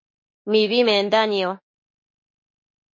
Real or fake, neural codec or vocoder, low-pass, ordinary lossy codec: fake; autoencoder, 48 kHz, 32 numbers a frame, DAC-VAE, trained on Japanese speech; 7.2 kHz; MP3, 32 kbps